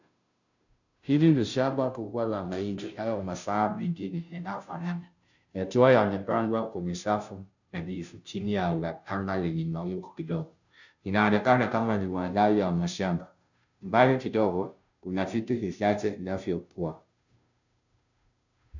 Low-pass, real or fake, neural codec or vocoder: 7.2 kHz; fake; codec, 16 kHz, 0.5 kbps, FunCodec, trained on Chinese and English, 25 frames a second